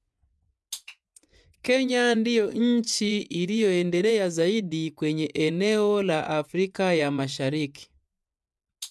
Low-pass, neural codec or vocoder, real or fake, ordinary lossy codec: none; vocoder, 24 kHz, 100 mel bands, Vocos; fake; none